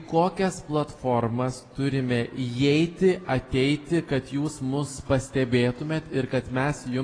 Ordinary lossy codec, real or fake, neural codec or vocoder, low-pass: AAC, 32 kbps; real; none; 9.9 kHz